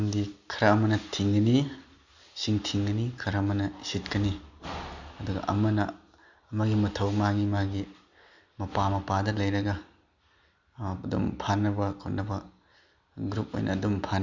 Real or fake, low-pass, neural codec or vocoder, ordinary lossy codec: real; 7.2 kHz; none; Opus, 64 kbps